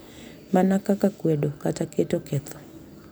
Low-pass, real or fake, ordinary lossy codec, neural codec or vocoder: none; real; none; none